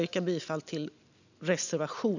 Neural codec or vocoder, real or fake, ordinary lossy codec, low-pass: none; real; none; 7.2 kHz